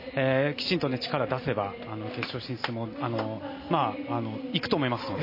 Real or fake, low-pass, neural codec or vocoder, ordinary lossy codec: real; 5.4 kHz; none; MP3, 24 kbps